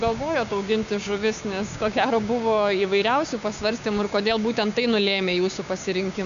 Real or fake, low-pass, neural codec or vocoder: fake; 7.2 kHz; codec, 16 kHz, 6 kbps, DAC